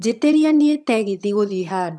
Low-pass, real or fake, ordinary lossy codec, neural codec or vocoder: none; fake; none; vocoder, 22.05 kHz, 80 mel bands, HiFi-GAN